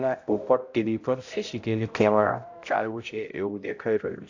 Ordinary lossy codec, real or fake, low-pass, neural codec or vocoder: AAC, 48 kbps; fake; 7.2 kHz; codec, 16 kHz, 0.5 kbps, X-Codec, HuBERT features, trained on balanced general audio